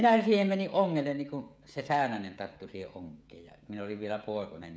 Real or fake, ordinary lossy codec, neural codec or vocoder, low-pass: fake; none; codec, 16 kHz, 16 kbps, FreqCodec, smaller model; none